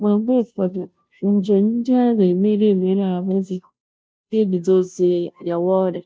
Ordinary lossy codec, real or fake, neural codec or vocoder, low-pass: none; fake; codec, 16 kHz, 0.5 kbps, FunCodec, trained on Chinese and English, 25 frames a second; none